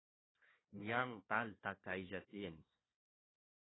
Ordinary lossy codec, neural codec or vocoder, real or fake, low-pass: AAC, 16 kbps; codec, 16 kHz, 1 kbps, FunCodec, trained on Chinese and English, 50 frames a second; fake; 7.2 kHz